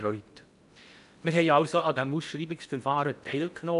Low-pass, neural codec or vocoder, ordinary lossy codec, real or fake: 10.8 kHz; codec, 16 kHz in and 24 kHz out, 0.8 kbps, FocalCodec, streaming, 65536 codes; none; fake